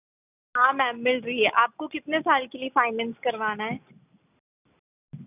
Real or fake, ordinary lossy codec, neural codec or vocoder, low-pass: real; none; none; 3.6 kHz